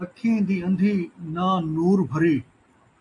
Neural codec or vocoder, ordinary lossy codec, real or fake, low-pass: none; AAC, 48 kbps; real; 10.8 kHz